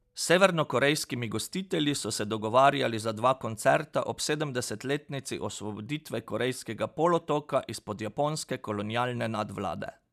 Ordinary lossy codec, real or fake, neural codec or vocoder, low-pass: none; real; none; 14.4 kHz